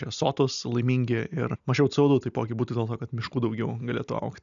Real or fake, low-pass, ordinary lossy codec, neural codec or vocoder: real; 7.2 kHz; MP3, 96 kbps; none